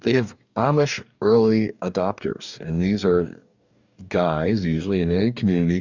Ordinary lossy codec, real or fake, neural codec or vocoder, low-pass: Opus, 64 kbps; fake; codec, 44.1 kHz, 2.6 kbps, DAC; 7.2 kHz